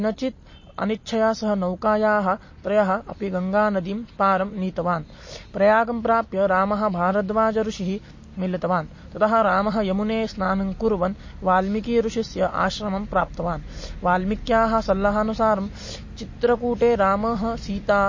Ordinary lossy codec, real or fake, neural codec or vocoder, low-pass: MP3, 32 kbps; real; none; 7.2 kHz